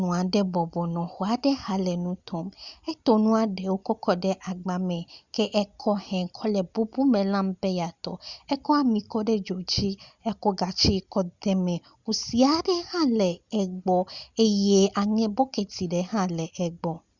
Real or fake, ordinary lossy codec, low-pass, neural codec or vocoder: real; Opus, 64 kbps; 7.2 kHz; none